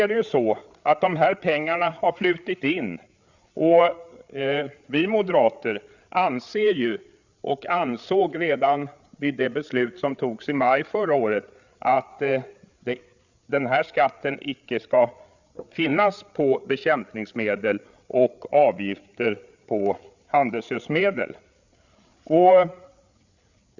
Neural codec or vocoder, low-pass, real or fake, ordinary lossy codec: codec, 16 kHz, 8 kbps, FreqCodec, larger model; 7.2 kHz; fake; Opus, 64 kbps